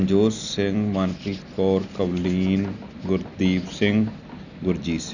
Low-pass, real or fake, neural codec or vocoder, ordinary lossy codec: 7.2 kHz; real; none; none